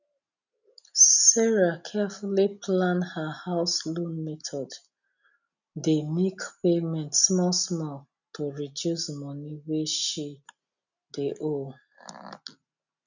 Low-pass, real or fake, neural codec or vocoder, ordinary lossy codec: 7.2 kHz; real; none; none